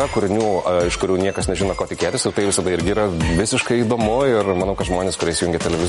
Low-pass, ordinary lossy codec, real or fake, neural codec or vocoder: 19.8 kHz; MP3, 48 kbps; real; none